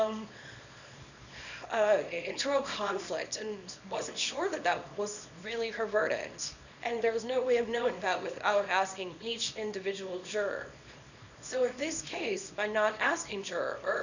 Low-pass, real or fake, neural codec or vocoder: 7.2 kHz; fake; codec, 24 kHz, 0.9 kbps, WavTokenizer, small release